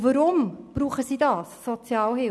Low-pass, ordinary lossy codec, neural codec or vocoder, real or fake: none; none; none; real